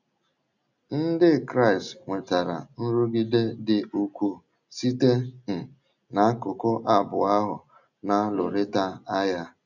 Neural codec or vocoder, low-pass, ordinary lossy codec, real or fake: none; 7.2 kHz; none; real